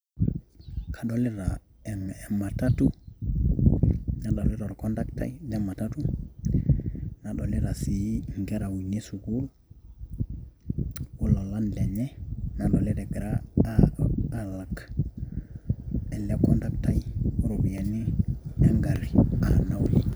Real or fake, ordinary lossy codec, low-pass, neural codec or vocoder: real; none; none; none